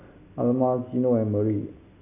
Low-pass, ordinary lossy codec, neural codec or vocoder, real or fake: 3.6 kHz; none; none; real